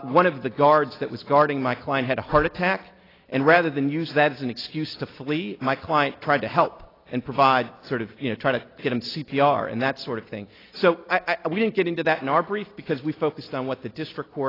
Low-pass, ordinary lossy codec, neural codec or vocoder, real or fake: 5.4 kHz; AAC, 24 kbps; none; real